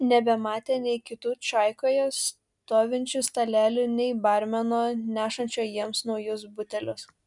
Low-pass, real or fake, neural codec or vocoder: 10.8 kHz; real; none